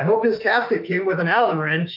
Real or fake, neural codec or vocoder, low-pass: fake; autoencoder, 48 kHz, 32 numbers a frame, DAC-VAE, trained on Japanese speech; 5.4 kHz